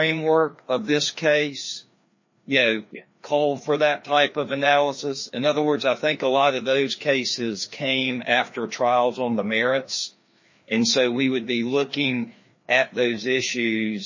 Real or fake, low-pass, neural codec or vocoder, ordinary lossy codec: fake; 7.2 kHz; codec, 16 kHz, 2 kbps, FreqCodec, larger model; MP3, 32 kbps